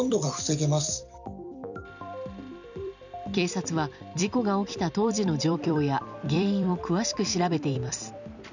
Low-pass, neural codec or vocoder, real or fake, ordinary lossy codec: 7.2 kHz; vocoder, 44.1 kHz, 128 mel bands every 256 samples, BigVGAN v2; fake; none